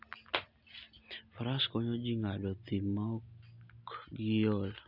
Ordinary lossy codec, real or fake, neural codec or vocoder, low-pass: none; real; none; 5.4 kHz